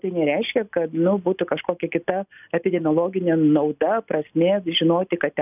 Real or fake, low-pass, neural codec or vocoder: real; 3.6 kHz; none